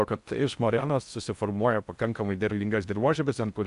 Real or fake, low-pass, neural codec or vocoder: fake; 10.8 kHz; codec, 16 kHz in and 24 kHz out, 0.6 kbps, FocalCodec, streaming, 4096 codes